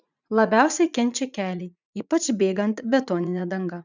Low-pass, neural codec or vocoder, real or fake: 7.2 kHz; vocoder, 44.1 kHz, 80 mel bands, Vocos; fake